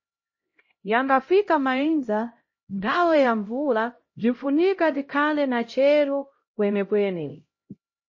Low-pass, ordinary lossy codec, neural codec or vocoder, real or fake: 7.2 kHz; MP3, 32 kbps; codec, 16 kHz, 0.5 kbps, X-Codec, HuBERT features, trained on LibriSpeech; fake